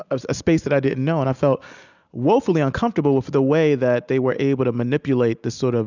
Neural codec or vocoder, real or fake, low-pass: none; real; 7.2 kHz